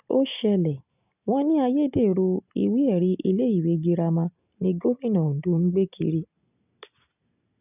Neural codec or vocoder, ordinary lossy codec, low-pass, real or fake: none; none; 3.6 kHz; real